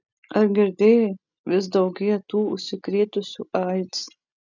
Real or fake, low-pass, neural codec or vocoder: real; 7.2 kHz; none